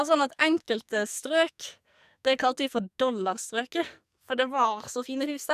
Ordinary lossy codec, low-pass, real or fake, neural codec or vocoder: none; 14.4 kHz; fake; codec, 44.1 kHz, 2.6 kbps, SNAC